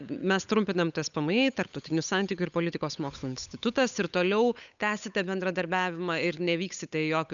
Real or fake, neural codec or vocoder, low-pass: fake; codec, 16 kHz, 8 kbps, FunCodec, trained on Chinese and English, 25 frames a second; 7.2 kHz